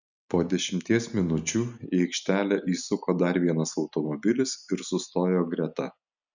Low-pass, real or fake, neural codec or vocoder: 7.2 kHz; real; none